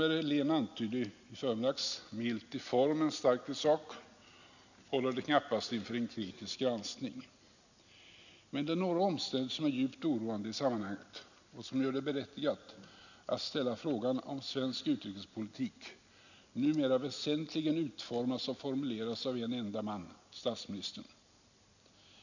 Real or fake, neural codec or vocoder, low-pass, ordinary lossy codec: real; none; 7.2 kHz; none